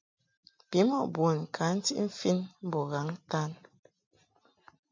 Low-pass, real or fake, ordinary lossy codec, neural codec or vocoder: 7.2 kHz; real; MP3, 64 kbps; none